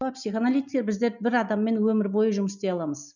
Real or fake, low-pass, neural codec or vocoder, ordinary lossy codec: real; 7.2 kHz; none; none